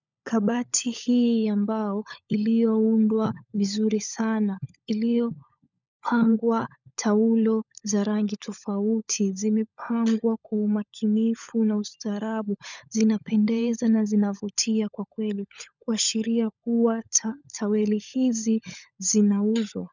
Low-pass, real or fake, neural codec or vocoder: 7.2 kHz; fake; codec, 16 kHz, 16 kbps, FunCodec, trained on LibriTTS, 50 frames a second